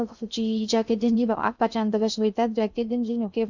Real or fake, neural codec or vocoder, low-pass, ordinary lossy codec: fake; codec, 16 kHz in and 24 kHz out, 0.6 kbps, FocalCodec, streaming, 2048 codes; 7.2 kHz; none